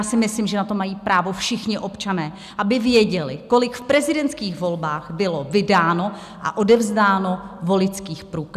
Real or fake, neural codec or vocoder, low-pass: real; none; 14.4 kHz